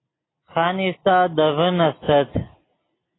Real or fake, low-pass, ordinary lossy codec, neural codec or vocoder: real; 7.2 kHz; AAC, 16 kbps; none